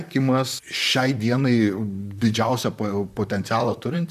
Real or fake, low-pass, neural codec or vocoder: fake; 14.4 kHz; vocoder, 44.1 kHz, 128 mel bands, Pupu-Vocoder